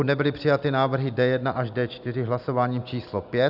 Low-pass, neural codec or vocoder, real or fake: 5.4 kHz; none; real